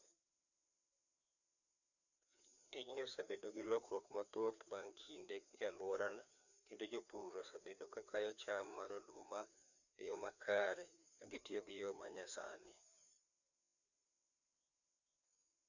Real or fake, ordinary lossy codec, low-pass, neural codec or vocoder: fake; none; 7.2 kHz; codec, 16 kHz, 2 kbps, FreqCodec, larger model